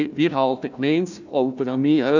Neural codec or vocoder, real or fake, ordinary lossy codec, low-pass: codec, 16 kHz, 1 kbps, FunCodec, trained on Chinese and English, 50 frames a second; fake; none; 7.2 kHz